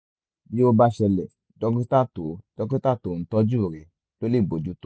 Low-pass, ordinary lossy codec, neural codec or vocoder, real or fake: none; none; none; real